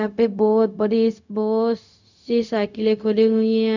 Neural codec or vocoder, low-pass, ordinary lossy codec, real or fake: codec, 16 kHz, 0.4 kbps, LongCat-Audio-Codec; 7.2 kHz; none; fake